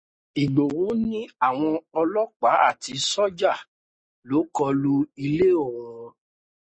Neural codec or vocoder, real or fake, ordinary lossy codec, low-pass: none; real; MP3, 32 kbps; 9.9 kHz